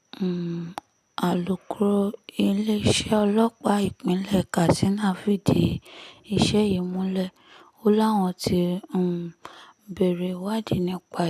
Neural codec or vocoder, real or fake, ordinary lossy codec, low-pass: none; real; none; 14.4 kHz